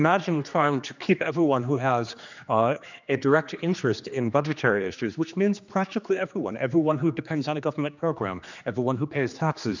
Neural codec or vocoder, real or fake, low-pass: codec, 16 kHz, 2 kbps, X-Codec, HuBERT features, trained on general audio; fake; 7.2 kHz